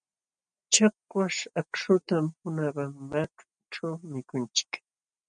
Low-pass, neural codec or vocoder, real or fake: 9.9 kHz; none; real